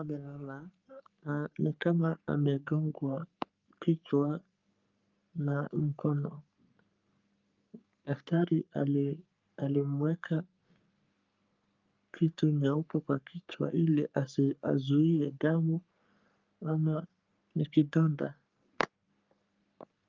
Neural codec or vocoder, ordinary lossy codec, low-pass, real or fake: codec, 44.1 kHz, 3.4 kbps, Pupu-Codec; Opus, 24 kbps; 7.2 kHz; fake